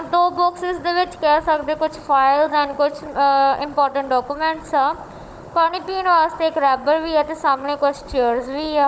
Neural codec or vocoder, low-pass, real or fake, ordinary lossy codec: codec, 16 kHz, 16 kbps, FunCodec, trained on Chinese and English, 50 frames a second; none; fake; none